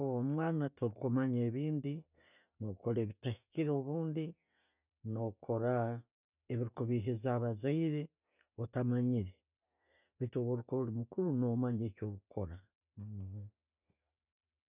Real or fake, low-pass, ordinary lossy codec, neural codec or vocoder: real; 3.6 kHz; none; none